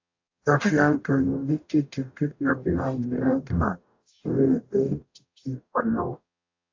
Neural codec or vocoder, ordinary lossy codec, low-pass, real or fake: codec, 44.1 kHz, 0.9 kbps, DAC; AAC, 48 kbps; 7.2 kHz; fake